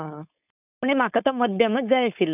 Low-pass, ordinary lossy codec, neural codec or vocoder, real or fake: 3.6 kHz; none; codec, 16 kHz, 4.8 kbps, FACodec; fake